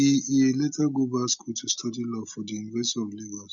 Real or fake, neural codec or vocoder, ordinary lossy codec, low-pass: real; none; none; 7.2 kHz